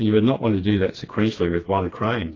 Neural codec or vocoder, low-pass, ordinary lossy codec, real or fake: codec, 16 kHz, 2 kbps, FreqCodec, smaller model; 7.2 kHz; AAC, 32 kbps; fake